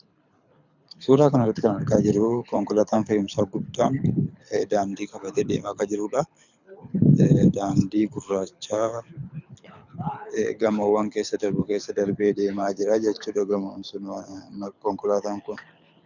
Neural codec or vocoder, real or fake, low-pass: codec, 24 kHz, 6 kbps, HILCodec; fake; 7.2 kHz